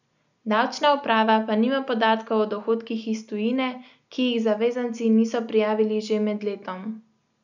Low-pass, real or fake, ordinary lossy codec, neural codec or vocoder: 7.2 kHz; real; none; none